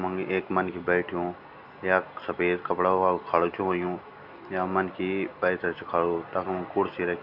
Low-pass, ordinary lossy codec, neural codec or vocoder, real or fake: 5.4 kHz; none; none; real